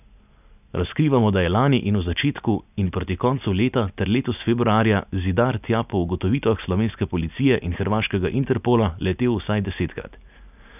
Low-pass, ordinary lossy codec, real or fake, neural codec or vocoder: 3.6 kHz; none; real; none